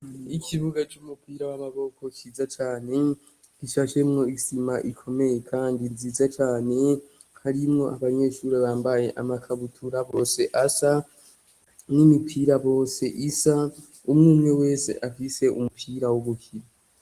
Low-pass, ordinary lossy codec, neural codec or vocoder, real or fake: 14.4 kHz; Opus, 24 kbps; none; real